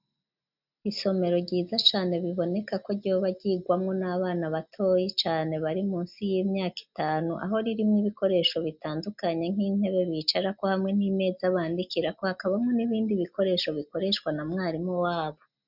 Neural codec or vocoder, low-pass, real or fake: none; 5.4 kHz; real